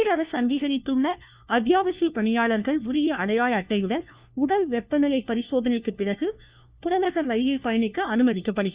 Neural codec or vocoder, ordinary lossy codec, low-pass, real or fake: codec, 16 kHz, 1 kbps, FunCodec, trained on LibriTTS, 50 frames a second; Opus, 64 kbps; 3.6 kHz; fake